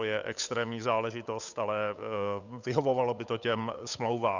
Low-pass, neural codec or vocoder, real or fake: 7.2 kHz; codec, 16 kHz, 8 kbps, FunCodec, trained on Chinese and English, 25 frames a second; fake